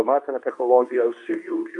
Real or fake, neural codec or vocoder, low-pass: fake; codec, 24 kHz, 0.9 kbps, WavTokenizer, medium speech release version 2; 10.8 kHz